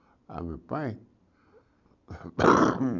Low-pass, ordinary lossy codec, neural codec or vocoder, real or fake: 7.2 kHz; none; none; real